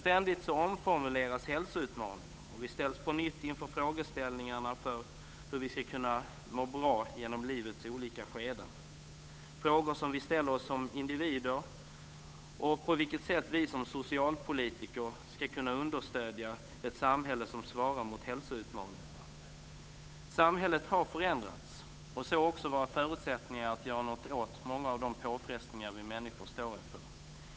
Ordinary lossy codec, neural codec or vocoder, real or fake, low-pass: none; codec, 16 kHz, 8 kbps, FunCodec, trained on Chinese and English, 25 frames a second; fake; none